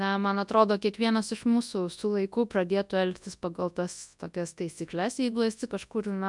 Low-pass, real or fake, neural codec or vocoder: 10.8 kHz; fake; codec, 24 kHz, 0.9 kbps, WavTokenizer, large speech release